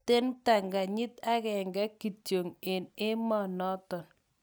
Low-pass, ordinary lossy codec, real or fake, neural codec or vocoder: none; none; real; none